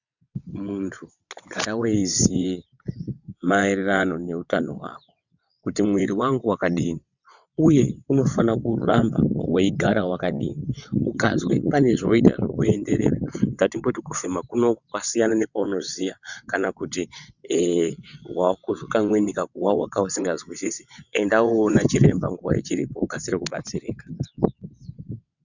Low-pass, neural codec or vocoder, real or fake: 7.2 kHz; vocoder, 22.05 kHz, 80 mel bands, WaveNeXt; fake